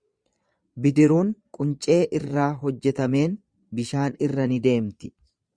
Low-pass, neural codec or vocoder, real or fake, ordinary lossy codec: 9.9 kHz; vocoder, 22.05 kHz, 80 mel bands, Vocos; fake; Opus, 64 kbps